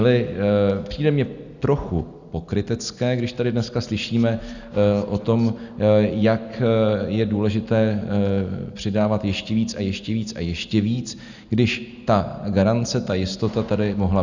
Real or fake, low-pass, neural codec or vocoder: real; 7.2 kHz; none